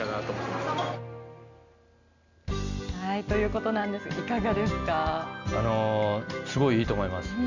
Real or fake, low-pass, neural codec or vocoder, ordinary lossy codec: real; 7.2 kHz; none; none